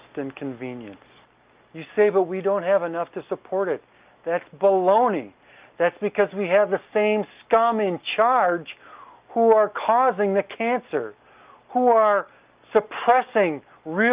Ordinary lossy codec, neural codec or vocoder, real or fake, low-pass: Opus, 24 kbps; none; real; 3.6 kHz